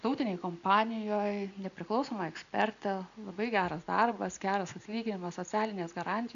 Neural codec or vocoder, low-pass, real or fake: none; 7.2 kHz; real